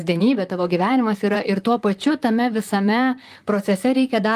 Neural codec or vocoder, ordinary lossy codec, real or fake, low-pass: vocoder, 44.1 kHz, 128 mel bands, Pupu-Vocoder; Opus, 32 kbps; fake; 14.4 kHz